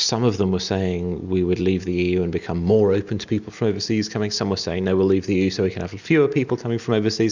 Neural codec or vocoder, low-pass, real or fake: none; 7.2 kHz; real